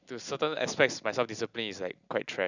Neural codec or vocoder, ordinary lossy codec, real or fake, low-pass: none; none; real; 7.2 kHz